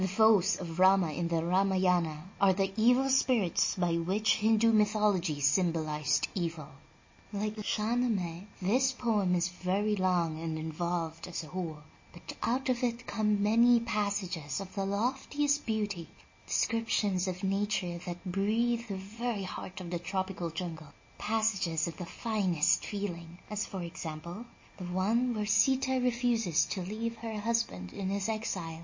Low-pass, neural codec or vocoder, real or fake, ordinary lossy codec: 7.2 kHz; none; real; MP3, 32 kbps